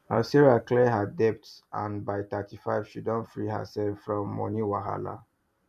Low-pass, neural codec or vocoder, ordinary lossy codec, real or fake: 14.4 kHz; vocoder, 44.1 kHz, 128 mel bands every 256 samples, BigVGAN v2; none; fake